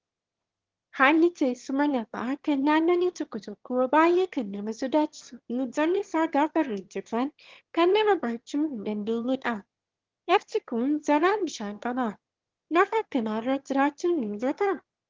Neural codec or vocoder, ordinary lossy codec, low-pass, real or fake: autoencoder, 22.05 kHz, a latent of 192 numbers a frame, VITS, trained on one speaker; Opus, 16 kbps; 7.2 kHz; fake